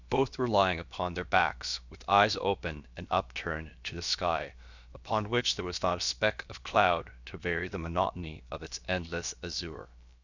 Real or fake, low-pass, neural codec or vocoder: fake; 7.2 kHz; codec, 16 kHz, about 1 kbps, DyCAST, with the encoder's durations